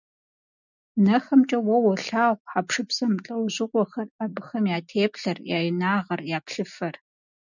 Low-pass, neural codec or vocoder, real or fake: 7.2 kHz; none; real